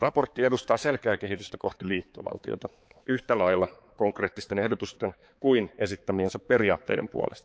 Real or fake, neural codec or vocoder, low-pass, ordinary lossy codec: fake; codec, 16 kHz, 4 kbps, X-Codec, HuBERT features, trained on balanced general audio; none; none